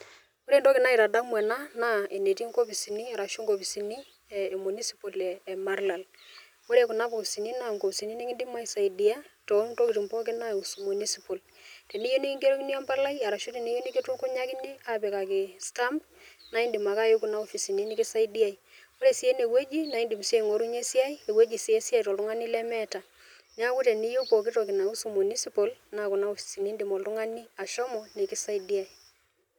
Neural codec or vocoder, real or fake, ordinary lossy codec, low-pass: none; real; none; none